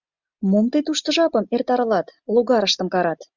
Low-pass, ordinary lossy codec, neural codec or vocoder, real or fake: 7.2 kHz; Opus, 64 kbps; none; real